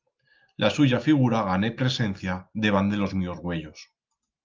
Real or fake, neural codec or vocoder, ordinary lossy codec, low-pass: real; none; Opus, 32 kbps; 7.2 kHz